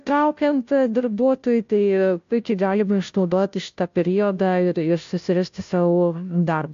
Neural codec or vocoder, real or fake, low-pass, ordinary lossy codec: codec, 16 kHz, 0.5 kbps, FunCodec, trained on Chinese and English, 25 frames a second; fake; 7.2 kHz; AAC, 64 kbps